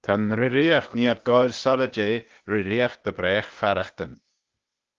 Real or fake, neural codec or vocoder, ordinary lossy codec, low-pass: fake; codec, 16 kHz, 0.8 kbps, ZipCodec; Opus, 32 kbps; 7.2 kHz